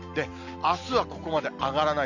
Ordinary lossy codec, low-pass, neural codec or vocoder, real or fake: none; 7.2 kHz; none; real